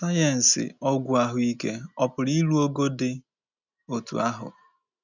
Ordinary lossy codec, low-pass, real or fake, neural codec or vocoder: none; 7.2 kHz; real; none